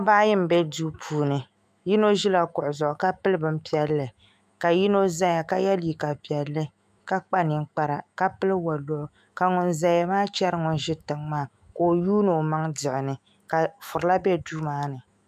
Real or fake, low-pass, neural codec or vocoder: fake; 14.4 kHz; autoencoder, 48 kHz, 128 numbers a frame, DAC-VAE, trained on Japanese speech